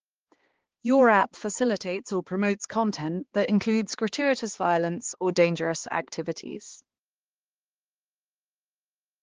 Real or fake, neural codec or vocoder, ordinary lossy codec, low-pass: fake; codec, 16 kHz, 2 kbps, X-Codec, HuBERT features, trained on balanced general audio; Opus, 16 kbps; 7.2 kHz